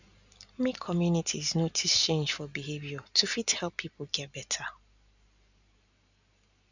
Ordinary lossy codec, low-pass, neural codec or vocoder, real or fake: none; 7.2 kHz; none; real